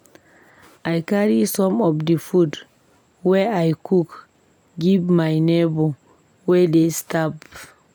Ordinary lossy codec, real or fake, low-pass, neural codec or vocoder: none; real; none; none